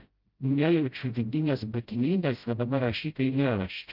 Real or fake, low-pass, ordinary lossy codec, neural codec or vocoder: fake; 5.4 kHz; Opus, 24 kbps; codec, 16 kHz, 0.5 kbps, FreqCodec, smaller model